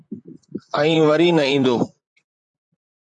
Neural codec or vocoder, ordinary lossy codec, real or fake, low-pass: vocoder, 44.1 kHz, 128 mel bands, Pupu-Vocoder; MP3, 64 kbps; fake; 10.8 kHz